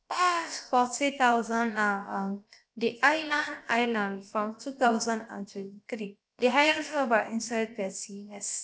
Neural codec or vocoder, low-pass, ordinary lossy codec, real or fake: codec, 16 kHz, about 1 kbps, DyCAST, with the encoder's durations; none; none; fake